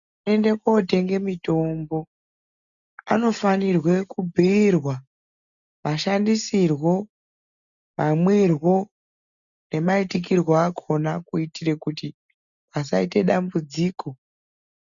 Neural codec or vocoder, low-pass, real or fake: none; 7.2 kHz; real